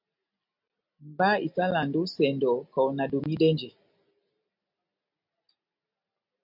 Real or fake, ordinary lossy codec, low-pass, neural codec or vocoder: real; MP3, 48 kbps; 5.4 kHz; none